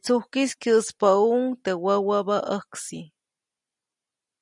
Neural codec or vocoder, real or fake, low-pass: none; real; 10.8 kHz